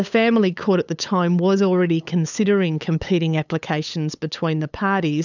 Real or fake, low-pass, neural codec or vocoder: fake; 7.2 kHz; codec, 16 kHz, 8 kbps, FunCodec, trained on LibriTTS, 25 frames a second